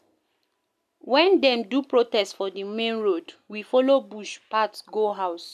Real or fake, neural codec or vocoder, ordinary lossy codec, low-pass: real; none; none; 14.4 kHz